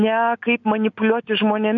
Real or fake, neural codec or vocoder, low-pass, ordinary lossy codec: real; none; 7.2 kHz; AAC, 64 kbps